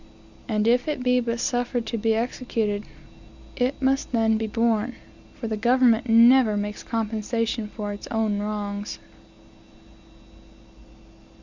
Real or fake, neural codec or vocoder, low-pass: real; none; 7.2 kHz